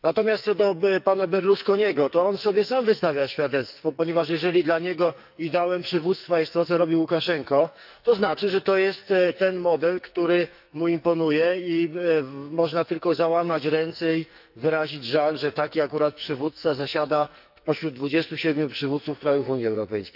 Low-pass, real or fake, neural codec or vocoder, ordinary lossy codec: 5.4 kHz; fake; codec, 44.1 kHz, 2.6 kbps, SNAC; none